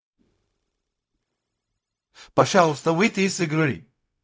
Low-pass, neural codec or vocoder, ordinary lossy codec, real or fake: none; codec, 16 kHz, 0.4 kbps, LongCat-Audio-Codec; none; fake